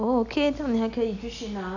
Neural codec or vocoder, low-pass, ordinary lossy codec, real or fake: none; 7.2 kHz; none; real